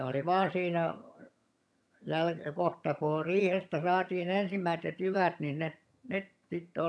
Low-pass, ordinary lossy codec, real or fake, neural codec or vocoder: none; none; fake; vocoder, 22.05 kHz, 80 mel bands, HiFi-GAN